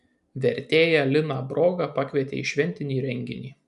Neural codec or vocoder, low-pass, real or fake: none; 10.8 kHz; real